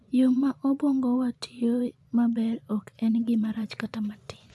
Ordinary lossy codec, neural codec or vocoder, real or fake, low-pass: none; vocoder, 24 kHz, 100 mel bands, Vocos; fake; none